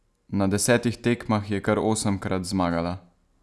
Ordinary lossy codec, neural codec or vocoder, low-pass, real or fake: none; none; none; real